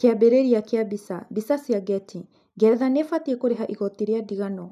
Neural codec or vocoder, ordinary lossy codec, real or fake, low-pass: none; none; real; 14.4 kHz